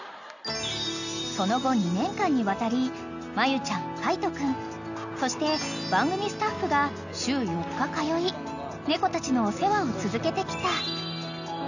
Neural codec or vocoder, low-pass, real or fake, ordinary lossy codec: none; 7.2 kHz; real; none